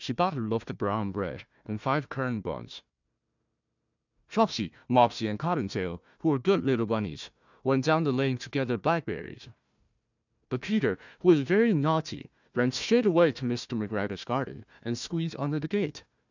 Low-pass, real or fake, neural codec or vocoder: 7.2 kHz; fake; codec, 16 kHz, 1 kbps, FunCodec, trained on Chinese and English, 50 frames a second